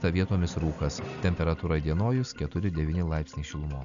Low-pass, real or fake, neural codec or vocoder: 7.2 kHz; real; none